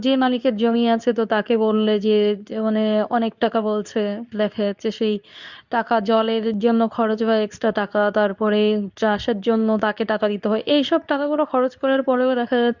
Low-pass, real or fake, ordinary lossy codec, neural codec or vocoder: 7.2 kHz; fake; none; codec, 24 kHz, 0.9 kbps, WavTokenizer, medium speech release version 2